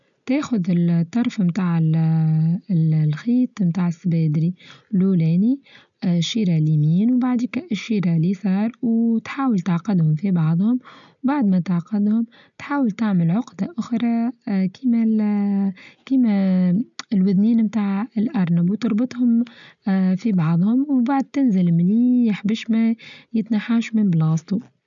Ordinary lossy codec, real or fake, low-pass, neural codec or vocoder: none; real; 7.2 kHz; none